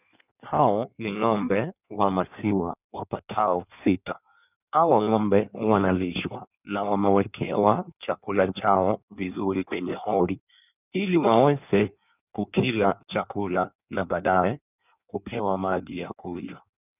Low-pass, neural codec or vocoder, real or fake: 3.6 kHz; codec, 16 kHz in and 24 kHz out, 1.1 kbps, FireRedTTS-2 codec; fake